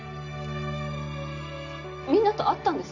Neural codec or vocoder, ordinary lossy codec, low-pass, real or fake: none; none; 7.2 kHz; real